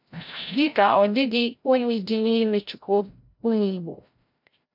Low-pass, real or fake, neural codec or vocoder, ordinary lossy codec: 5.4 kHz; fake; codec, 16 kHz, 0.5 kbps, FreqCodec, larger model; MP3, 48 kbps